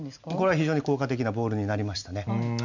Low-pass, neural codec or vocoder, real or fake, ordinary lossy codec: 7.2 kHz; none; real; none